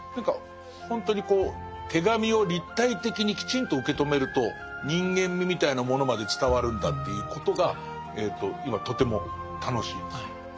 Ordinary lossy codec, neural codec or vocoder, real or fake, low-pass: none; none; real; none